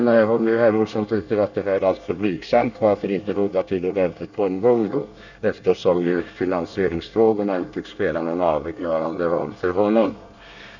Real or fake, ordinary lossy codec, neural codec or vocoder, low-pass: fake; none; codec, 24 kHz, 1 kbps, SNAC; 7.2 kHz